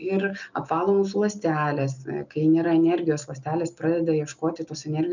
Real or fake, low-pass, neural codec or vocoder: real; 7.2 kHz; none